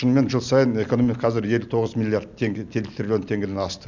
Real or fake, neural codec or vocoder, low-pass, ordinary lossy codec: real; none; 7.2 kHz; none